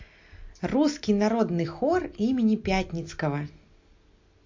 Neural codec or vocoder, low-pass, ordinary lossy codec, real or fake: none; 7.2 kHz; MP3, 48 kbps; real